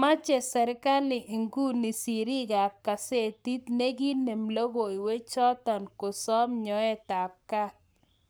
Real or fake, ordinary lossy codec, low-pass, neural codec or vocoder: fake; none; none; codec, 44.1 kHz, 7.8 kbps, Pupu-Codec